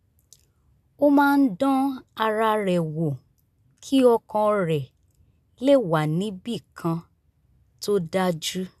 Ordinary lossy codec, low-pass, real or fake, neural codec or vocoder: none; 14.4 kHz; real; none